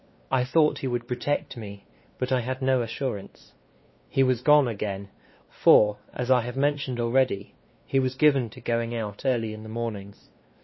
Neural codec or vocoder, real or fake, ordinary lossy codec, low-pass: codec, 16 kHz, 2 kbps, X-Codec, WavLM features, trained on Multilingual LibriSpeech; fake; MP3, 24 kbps; 7.2 kHz